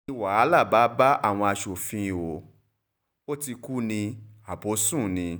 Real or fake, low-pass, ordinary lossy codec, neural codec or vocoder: real; none; none; none